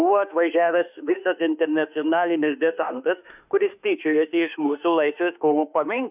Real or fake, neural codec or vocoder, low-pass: fake; autoencoder, 48 kHz, 32 numbers a frame, DAC-VAE, trained on Japanese speech; 3.6 kHz